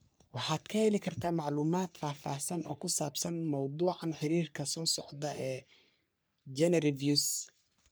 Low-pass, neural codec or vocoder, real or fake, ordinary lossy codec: none; codec, 44.1 kHz, 3.4 kbps, Pupu-Codec; fake; none